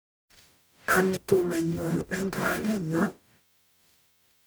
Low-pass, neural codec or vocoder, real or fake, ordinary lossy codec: none; codec, 44.1 kHz, 0.9 kbps, DAC; fake; none